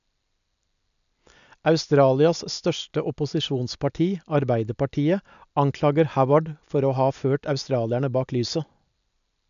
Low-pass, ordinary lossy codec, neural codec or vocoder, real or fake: 7.2 kHz; none; none; real